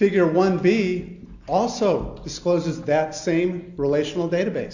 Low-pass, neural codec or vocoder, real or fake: 7.2 kHz; none; real